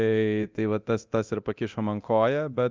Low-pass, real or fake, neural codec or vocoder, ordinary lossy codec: 7.2 kHz; fake; codec, 24 kHz, 0.9 kbps, DualCodec; Opus, 32 kbps